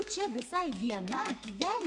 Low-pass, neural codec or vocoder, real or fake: 10.8 kHz; codec, 32 kHz, 1.9 kbps, SNAC; fake